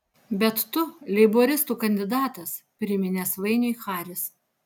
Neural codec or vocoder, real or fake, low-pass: none; real; 19.8 kHz